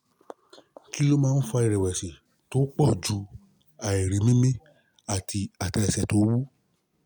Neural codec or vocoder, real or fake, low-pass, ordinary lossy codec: none; real; none; none